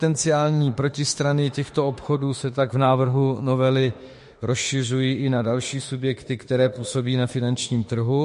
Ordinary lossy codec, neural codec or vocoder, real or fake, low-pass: MP3, 48 kbps; autoencoder, 48 kHz, 32 numbers a frame, DAC-VAE, trained on Japanese speech; fake; 14.4 kHz